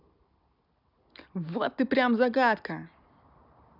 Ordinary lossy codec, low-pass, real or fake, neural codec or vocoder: none; 5.4 kHz; real; none